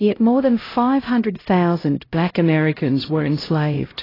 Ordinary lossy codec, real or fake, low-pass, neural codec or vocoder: AAC, 24 kbps; fake; 5.4 kHz; codec, 16 kHz, 0.5 kbps, X-Codec, HuBERT features, trained on LibriSpeech